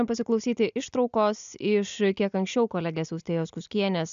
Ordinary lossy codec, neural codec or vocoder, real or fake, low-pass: AAC, 96 kbps; none; real; 7.2 kHz